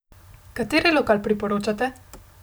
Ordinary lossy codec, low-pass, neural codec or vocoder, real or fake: none; none; none; real